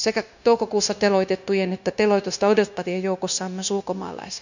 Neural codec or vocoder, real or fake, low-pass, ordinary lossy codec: codec, 16 kHz, 0.9 kbps, LongCat-Audio-Codec; fake; 7.2 kHz; none